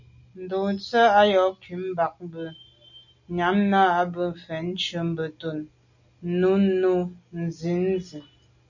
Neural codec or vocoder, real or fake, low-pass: none; real; 7.2 kHz